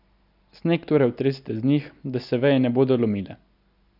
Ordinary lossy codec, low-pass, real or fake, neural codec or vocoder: none; 5.4 kHz; real; none